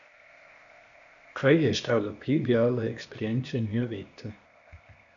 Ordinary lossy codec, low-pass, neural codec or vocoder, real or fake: MP3, 64 kbps; 7.2 kHz; codec, 16 kHz, 0.8 kbps, ZipCodec; fake